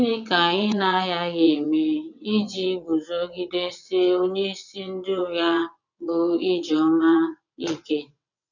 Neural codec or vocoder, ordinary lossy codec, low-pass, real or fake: vocoder, 22.05 kHz, 80 mel bands, WaveNeXt; none; 7.2 kHz; fake